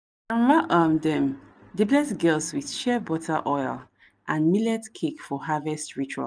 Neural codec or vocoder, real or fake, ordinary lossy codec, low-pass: none; real; none; none